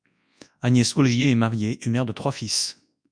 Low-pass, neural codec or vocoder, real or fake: 9.9 kHz; codec, 24 kHz, 0.9 kbps, WavTokenizer, large speech release; fake